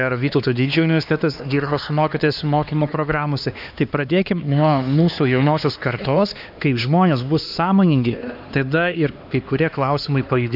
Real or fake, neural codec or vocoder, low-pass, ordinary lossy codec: fake; codec, 16 kHz, 2 kbps, X-Codec, HuBERT features, trained on LibriSpeech; 5.4 kHz; AAC, 48 kbps